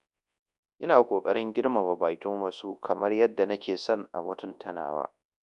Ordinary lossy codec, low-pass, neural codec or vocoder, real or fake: none; 10.8 kHz; codec, 24 kHz, 0.9 kbps, WavTokenizer, large speech release; fake